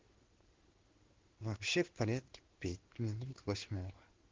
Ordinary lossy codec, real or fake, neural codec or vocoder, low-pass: Opus, 16 kbps; fake; codec, 16 kHz in and 24 kHz out, 1 kbps, XY-Tokenizer; 7.2 kHz